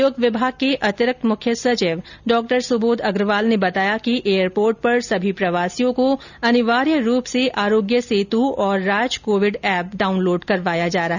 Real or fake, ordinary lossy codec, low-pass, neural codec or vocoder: real; none; 7.2 kHz; none